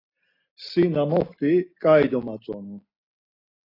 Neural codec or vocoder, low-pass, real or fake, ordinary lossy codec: none; 5.4 kHz; real; AAC, 24 kbps